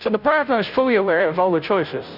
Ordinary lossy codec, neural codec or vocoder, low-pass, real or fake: Opus, 64 kbps; codec, 16 kHz, 0.5 kbps, FunCodec, trained on Chinese and English, 25 frames a second; 5.4 kHz; fake